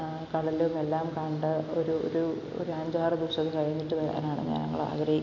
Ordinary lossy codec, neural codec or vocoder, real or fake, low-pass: none; none; real; 7.2 kHz